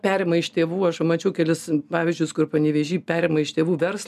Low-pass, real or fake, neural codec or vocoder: 14.4 kHz; real; none